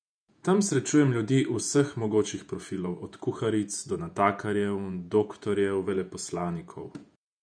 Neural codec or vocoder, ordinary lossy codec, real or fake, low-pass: vocoder, 48 kHz, 128 mel bands, Vocos; none; fake; 9.9 kHz